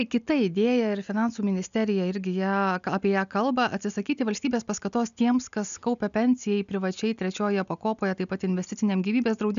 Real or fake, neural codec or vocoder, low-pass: real; none; 7.2 kHz